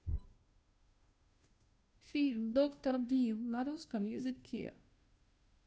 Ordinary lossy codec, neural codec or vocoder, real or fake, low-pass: none; codec, 16 kHz, 0.5 kbps, FunCodec, trained on Chinese and English, 25 frames a second; fake; none